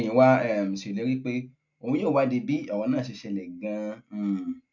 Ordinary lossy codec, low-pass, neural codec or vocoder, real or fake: none; 7.2 kHz; none; real